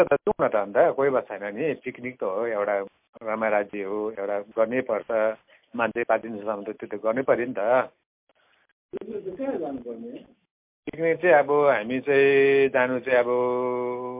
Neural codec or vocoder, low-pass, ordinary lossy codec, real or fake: none; 3.6 kHz; MP3, 32 kbps; real